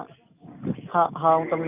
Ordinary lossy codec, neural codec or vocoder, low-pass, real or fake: none; none; 3.6 kHz; real